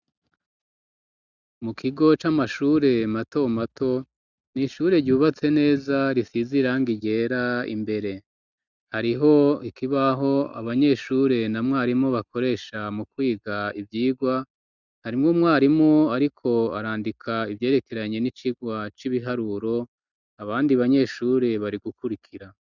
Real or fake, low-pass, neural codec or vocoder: real; 7.2 kHz; none